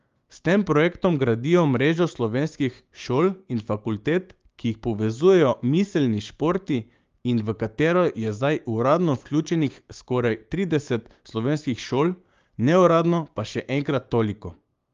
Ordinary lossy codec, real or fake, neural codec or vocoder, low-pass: Opus, 32 kbps; fake; codec, 16 kHz, 6 kbps, DAC; 7.2 kHz